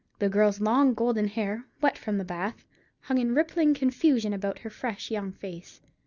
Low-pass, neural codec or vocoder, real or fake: 7.2 kHz; none; real